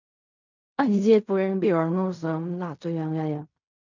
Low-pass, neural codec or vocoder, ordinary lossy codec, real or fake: 7.2 kHz; codec, 16 kHz in and 24 kHz out, 0.4 kbps, LongCat-Audio-Codec, fine tuned four codebook decoder; none; fake